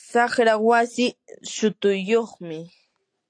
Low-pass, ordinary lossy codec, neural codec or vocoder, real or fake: 9.9 kHz; AAC, 48 kbps; none; real